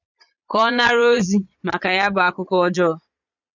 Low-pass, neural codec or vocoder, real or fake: 7.2 kHz; vocoder, 22.05 kHz, 80 mel bands, Vocos; fake